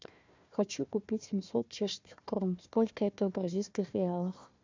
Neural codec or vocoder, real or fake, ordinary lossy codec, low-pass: codec, 16 kHz, 1 kbps, FunCodec, trained on Chinese and English, 50 frames a second; fake; MP3, 64 kbps; 7.2 kHz